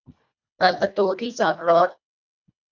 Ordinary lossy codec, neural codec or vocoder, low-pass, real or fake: none; codec, 24 kHz, 1.5 kbps, HILCodec; 7.2 kHz; fake